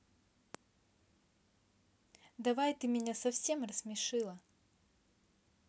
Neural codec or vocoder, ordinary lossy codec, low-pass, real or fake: none; none; none; real